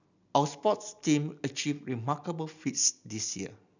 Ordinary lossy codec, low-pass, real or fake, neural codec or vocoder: none; 7.2 kHz; real; none